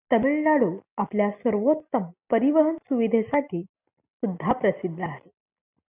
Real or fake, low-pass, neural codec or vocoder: real; 3.6 kHz; none